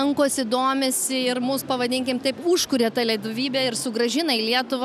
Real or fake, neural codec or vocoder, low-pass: real; none; 14.4 kHz